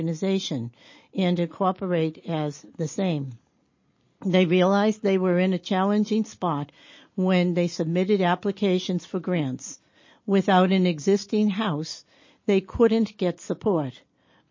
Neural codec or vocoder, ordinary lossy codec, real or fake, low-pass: none; MP3, 32 kbps; real; 7.2 kHz